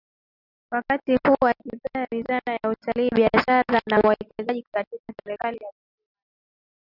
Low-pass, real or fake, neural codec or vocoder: 5.4 kHz; real; none